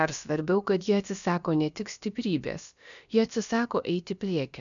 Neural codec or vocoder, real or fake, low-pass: codec, 16 kHz, about 1 kbps, DyCAST, with the encoder's durations; fake; 7.2 kHz